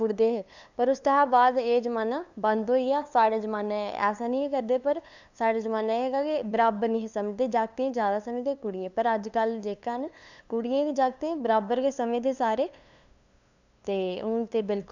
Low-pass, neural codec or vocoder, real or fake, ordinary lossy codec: 7.2 kHz; codec, 16 kHz, 2 kbps, FunCodec, trained on LibriTTS, 25 frames a second; fake; none